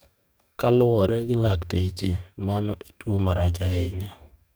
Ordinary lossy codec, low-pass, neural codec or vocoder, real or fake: none; none; codec, 44.1 kHz, 2.6 kbps, DAC; fake